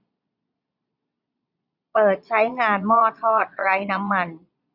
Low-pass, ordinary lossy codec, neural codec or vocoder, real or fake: 5.4 kHz; none; vocoder, 22.05 kHz, 80 mel bands, Vocos; fake